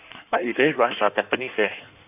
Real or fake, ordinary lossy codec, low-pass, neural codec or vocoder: fake; none; 3.6 kHz; codec, 16 kHz in and 24 kHz out, 1.1 kbps, FireRedTTS-2 codec